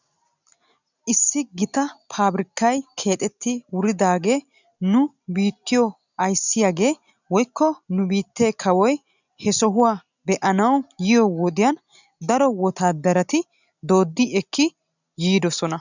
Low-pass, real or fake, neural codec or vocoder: 7.2 kHz; real; none